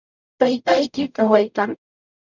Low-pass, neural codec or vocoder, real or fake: 7.2 kHz; codec, 44.1 kHz, 0.9 kbps, DAC; fake